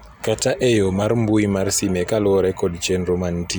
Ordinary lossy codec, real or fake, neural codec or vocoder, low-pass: none; real; none; none